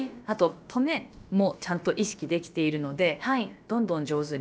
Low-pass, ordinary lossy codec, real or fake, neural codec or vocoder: none; none; fake; codec, 16 kHz, about 1 kbps, DyCAST, with the encoder's durations